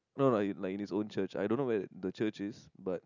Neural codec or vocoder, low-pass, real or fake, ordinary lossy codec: none; 7.2 kHz; real; none